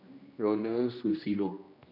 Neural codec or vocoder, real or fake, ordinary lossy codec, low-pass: codec, 16 kHz, 1 kbps, X-Codec, HuBERT features, trained on general audio; fake; none; 5.4 kHz